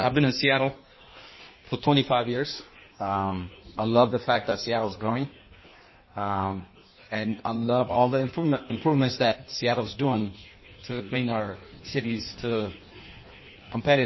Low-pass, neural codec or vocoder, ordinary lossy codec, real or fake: 7.2 kHz; codec, 16 kHz in and 24 kHz out, 1.1 kbps, FireRedTTS-2 codec; MP3, 24 kbps; fake